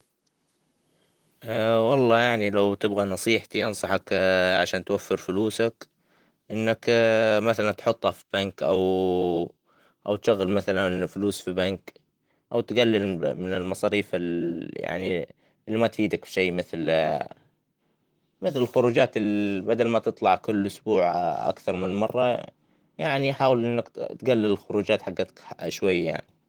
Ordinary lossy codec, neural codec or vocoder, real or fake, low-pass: Opus, 24 kbps; vocoder, 44.1 kHz, 128 mel bands, Pupu-Vocoder; fake; 19.8 kHz